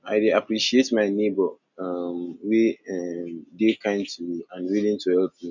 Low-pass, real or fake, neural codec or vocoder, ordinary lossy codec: 7.2 kHz; real; none; none